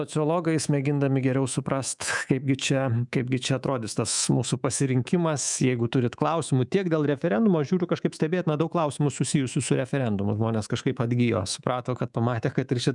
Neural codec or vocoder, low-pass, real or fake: codec, 24 kHz, 3.1 kbps, DualCodec; 10.8 kHz; fake